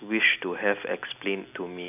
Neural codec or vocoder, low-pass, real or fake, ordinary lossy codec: none; 3.6 kHz; real; none